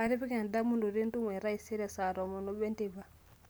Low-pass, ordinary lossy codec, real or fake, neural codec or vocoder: none; none; real; none